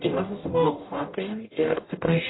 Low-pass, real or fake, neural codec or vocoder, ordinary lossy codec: 7.2 kHz; fake; codec, 44.1 kHz, 0.9 kbps, DAC; AAC, 16 kbps